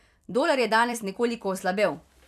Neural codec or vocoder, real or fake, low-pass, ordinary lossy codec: vocoder, 44.1 kHz, 128 mel bands every 256 samples, BigVGAN v2; fake; 14.4 kHz; MP3, 96 kbps